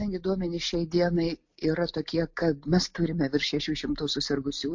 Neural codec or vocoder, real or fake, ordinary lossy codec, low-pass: none; real; MP3, 48 kbps; 7.2 kHz